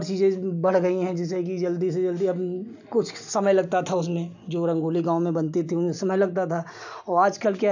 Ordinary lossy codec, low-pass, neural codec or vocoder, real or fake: none; 7.2 kHz; none; real